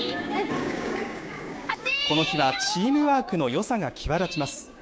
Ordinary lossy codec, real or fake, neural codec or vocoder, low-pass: none; fake; codec, 16 kHz, 6 kbps, DAC; none